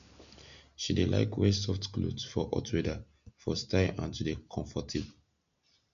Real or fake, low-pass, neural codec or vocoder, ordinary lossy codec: real; 7.2 kHz; none; none